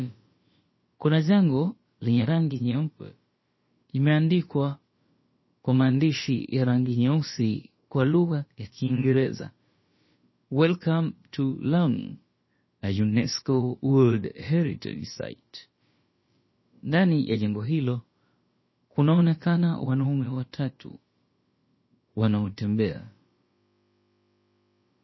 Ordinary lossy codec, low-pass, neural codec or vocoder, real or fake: MP3, 24 kbps; 7.2 kHz; codec, 16 kHz, about 1 kbps, DyCAST, with the encoder's durations; fake